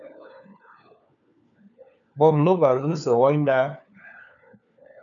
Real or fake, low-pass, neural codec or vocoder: fake; 7.2 kHz; codec, 16 kHz, 4 kbps, FunCodec, trained on LibriTTS, 50 frames a second